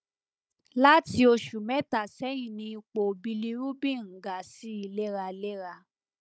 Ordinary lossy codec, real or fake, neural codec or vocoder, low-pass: none; fake; codec, 16 kHz, 16 kbps, FunCodec, trained on Chinese and English, 50 frames a second; none